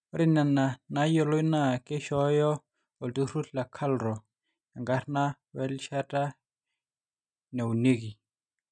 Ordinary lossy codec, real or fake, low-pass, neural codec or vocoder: none; real; 9.9 kHz; none